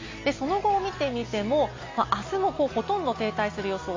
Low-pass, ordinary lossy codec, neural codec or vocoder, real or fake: 7.2 kHz; AAC, 32 kbps; none; real